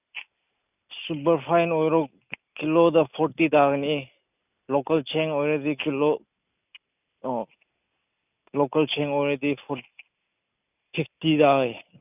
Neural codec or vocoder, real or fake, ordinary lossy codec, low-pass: none; real; none; 3.6 kHz